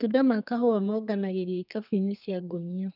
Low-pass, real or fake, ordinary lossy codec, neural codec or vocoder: 5.4 kHz; fake; none; codec, 32 kHz, 1.9 kbps, SNAC